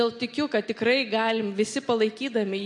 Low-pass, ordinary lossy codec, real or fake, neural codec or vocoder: 10.8 kHz; MP3, 48 kbps; real; none